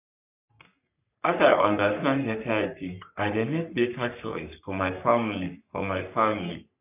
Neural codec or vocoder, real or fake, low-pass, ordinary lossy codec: codec, 44.1 kHz, 3.4 kbps, Pupu-Codec; fake; 3.6 kHz; none